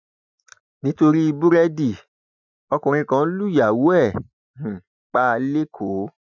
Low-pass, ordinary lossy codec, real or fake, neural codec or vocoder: 7.2 kHz; none; real; none